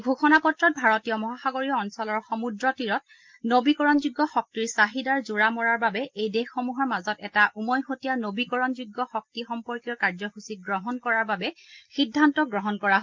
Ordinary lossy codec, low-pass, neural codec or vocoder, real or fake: Opus, 24 kbps; 7.2 kHz; none; real